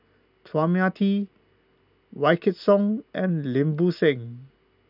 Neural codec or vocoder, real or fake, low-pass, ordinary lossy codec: none; real; 5.4 kHz; none